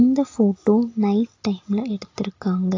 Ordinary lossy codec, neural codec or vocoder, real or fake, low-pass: AAC, 32 kbps; none; real; 7.2 kHz